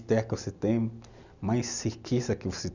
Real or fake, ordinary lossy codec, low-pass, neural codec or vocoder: real; none; 7.2 kHz; none